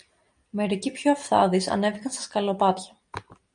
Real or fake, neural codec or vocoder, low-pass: real; none; 9.9 kHz